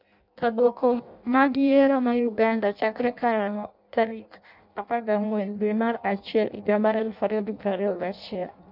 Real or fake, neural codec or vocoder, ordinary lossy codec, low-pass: fake; codec, 16 kHz in and 24 kHz out, 0.6 kbps, FireRedTTS-2 codec; none; 5.4 kHz